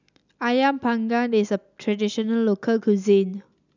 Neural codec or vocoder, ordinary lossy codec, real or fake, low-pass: none; none; real; 7.2 kHz